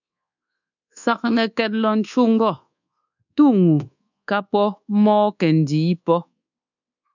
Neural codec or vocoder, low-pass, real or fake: codec, 24 kHz, 1.2 kbps, DualCodec; 7.2 kHz; fake